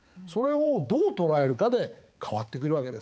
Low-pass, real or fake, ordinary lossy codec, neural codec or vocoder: none; fake; none; codec, 16 kHz, 4 kbps, X-Codec, HuBERT features, trained on balanced general audio